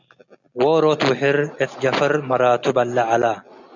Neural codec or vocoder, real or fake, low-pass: none; real; 7.2 kHz